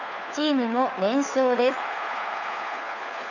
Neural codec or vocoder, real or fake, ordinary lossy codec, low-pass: codec, 16 kHz, 4 kbps, FunCodec, trained on LibriTTS, 50 frames a second; fake; none; 7.2 kHz